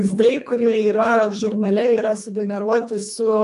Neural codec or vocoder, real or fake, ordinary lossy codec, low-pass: codec, 24 kHz, 1.5 kbps, HILCodec; fake; MP3, 64 kbps; 10.8 kHz